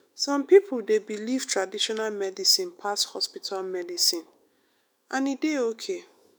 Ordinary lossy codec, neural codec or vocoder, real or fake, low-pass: none; autoencoder, 48 kHz, 128 numbers a frame, DAC-VAE, trained on Japanese speech; fake; none